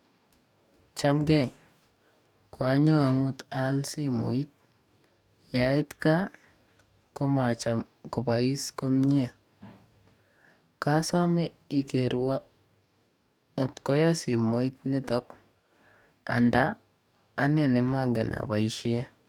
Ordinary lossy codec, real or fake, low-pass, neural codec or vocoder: none; fake; 19.8 kHz; codec, 44.1 kHz, 2.6 kbps, DAC